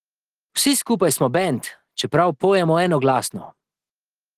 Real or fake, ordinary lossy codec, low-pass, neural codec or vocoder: real; Opus, 16 kbps; 14.4 kHz; none